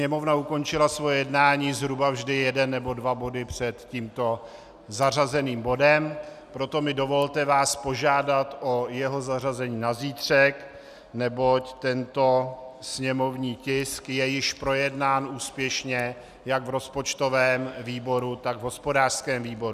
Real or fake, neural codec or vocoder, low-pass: real; none; 14.4 kHz